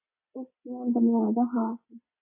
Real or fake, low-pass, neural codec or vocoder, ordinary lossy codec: real; 3.6 kHz; none; AAC, 32 kbps